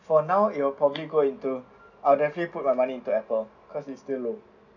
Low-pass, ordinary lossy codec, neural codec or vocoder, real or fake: 7.2 kHz; none; none; real